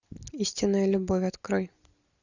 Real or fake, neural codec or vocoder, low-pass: real; none; 7.2 kHz